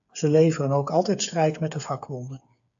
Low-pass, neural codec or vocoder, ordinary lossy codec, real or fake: 7.2 kHz; codec, 16 kHz, 8 kbps, FreqCodec, smaller model; AAC, 64 kbps; fake